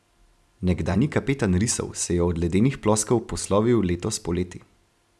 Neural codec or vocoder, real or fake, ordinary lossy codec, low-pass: none; real; none; none